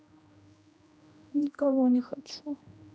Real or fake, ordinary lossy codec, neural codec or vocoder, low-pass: fake; none; codec, 16 kHz, 1 kbps, X-Codec, HuBERT features, trained on general audio; none